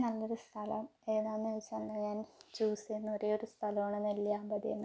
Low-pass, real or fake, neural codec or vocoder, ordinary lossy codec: none; real; none; none